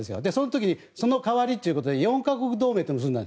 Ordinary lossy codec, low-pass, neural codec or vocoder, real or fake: none; none; none; real